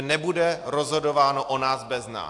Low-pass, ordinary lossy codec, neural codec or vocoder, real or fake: 10.8 kHz; AAC, 64 kbps; none; real